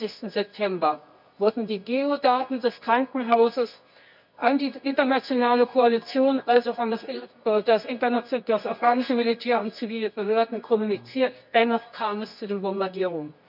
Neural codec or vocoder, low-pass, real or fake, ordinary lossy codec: codec, 24 kHz, 0.9 kbps, WavTokenizer, medium music audio release; 5.4 kHz; fake; none